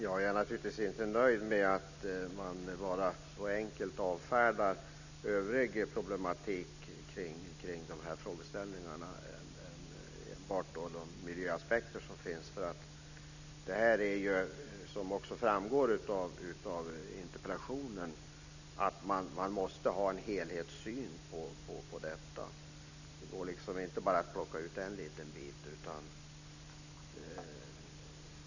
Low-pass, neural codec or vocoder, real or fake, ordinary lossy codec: 7.2 kHz; none; real; none